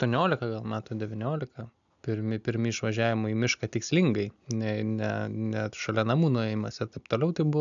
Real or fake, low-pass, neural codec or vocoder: real; 7.2 kHz; none